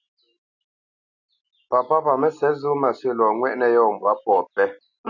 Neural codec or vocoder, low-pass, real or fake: none; 7.2 kHz; real